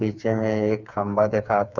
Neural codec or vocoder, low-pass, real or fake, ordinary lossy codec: codec, 16 kHz, 4 kbps, FreqCodec, smaller model; 7.2 kHz; fake; none